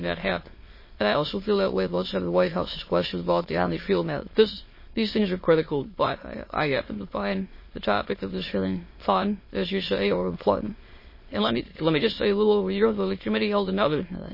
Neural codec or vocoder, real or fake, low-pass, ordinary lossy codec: autoencoder, 22.05 kHz, a latent of 192 numbers a frame, VITS, trained on many speakers; fake; 5.4 kHz; MP3, 24 kbps